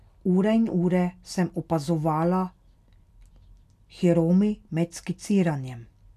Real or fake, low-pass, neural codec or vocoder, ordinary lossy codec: real; 14.4 kHz; none; none